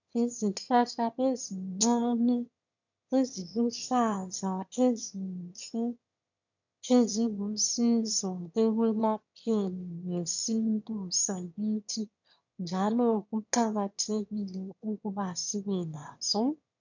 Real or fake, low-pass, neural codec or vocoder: fake; 7.2 kHz; autoencoder, 22.05 kHz, a latent of 192 numbers a frame, VITS, trained on one speaker